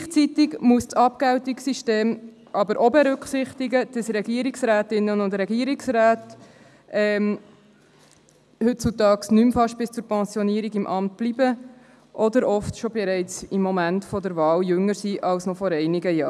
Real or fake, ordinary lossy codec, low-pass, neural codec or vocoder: real; none; none; none